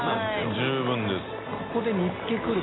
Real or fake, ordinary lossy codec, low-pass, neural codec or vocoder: real; AAC, 16 kbps; 7.2 kHz; none